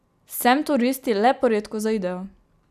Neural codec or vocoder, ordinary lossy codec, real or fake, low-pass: none; none; real; 14.4 kHz